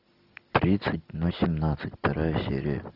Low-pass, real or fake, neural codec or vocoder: 5.4 kHz; real; none